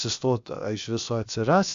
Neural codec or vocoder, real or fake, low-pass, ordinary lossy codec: codec, 16 kHz, about 1 kbps, DyCAST, with the encoder's durations; fake; 7.2 kHz; AAC, 64 kbps